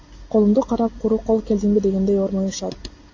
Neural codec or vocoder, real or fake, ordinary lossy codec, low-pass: none; real; AAC, 48 kbps; 7.2 kHz